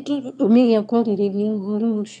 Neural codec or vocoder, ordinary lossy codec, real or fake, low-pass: autoencoder, 22.05 kHz, a latent of 192 numbers a frame, VITS, trained on one speaker; none; fake; 9.9 kHz